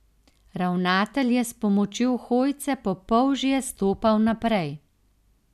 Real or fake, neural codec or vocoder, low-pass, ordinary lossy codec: real; none; 14.4 kHz; none